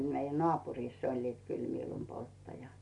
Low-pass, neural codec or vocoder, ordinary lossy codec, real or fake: 10.8 kHz; none; MP3, 48 kbps; real